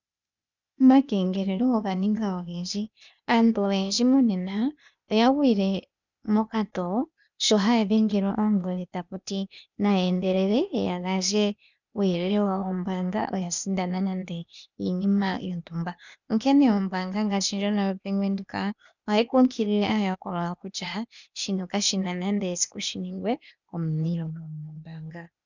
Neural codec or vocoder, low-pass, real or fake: codec, 16 kHz, 0.8 kbps, ZipCodec; 7.2 kHz; fake